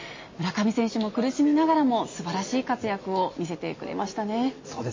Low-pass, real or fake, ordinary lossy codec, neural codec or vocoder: 7.2 kHz; real; MP3, 32 kbps; none